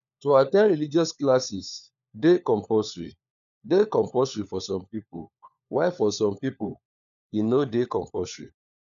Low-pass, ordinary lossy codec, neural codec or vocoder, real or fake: 7.2 kHz; none; codec, 16 kHz, 4 kbps, FunCodec, trained on LibriTTS, 50 frames a second; fake